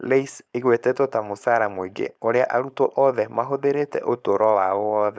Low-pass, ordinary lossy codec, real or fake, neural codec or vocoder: none; none; fake; codec, 16 kHz, 4.8 kbps, FACodec